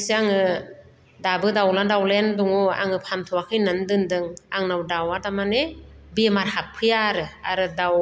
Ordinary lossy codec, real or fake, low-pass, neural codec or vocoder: none; real; none; none